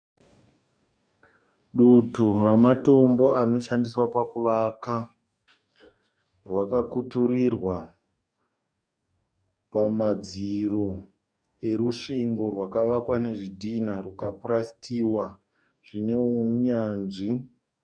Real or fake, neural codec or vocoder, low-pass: fake; codec, 44.1 kHz, 2.6 kbps, DAC; 9.9 kHz